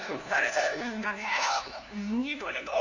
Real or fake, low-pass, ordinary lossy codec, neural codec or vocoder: fake; 7.2 kHz; none; codec, 16 kHz, 1 kbps, FunCodec, trained on LibriTTS, 50 frames a second